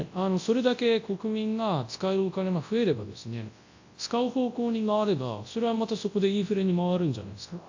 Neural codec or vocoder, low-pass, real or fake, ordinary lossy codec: codec, 24 kHz, 0.9 kbps, WavTokenizer, large speech release; 7.2 kHz; fake; AAC, 48 kbps